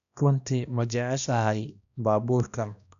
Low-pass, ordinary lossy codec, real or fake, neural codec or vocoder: 7.2 kHz; none; fake; codec, 16 kHz, 1 kbps, X-Codec, HuBERT features, trained on balanced general audio